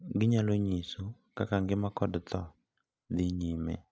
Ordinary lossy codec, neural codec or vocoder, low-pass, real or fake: none; none; none; real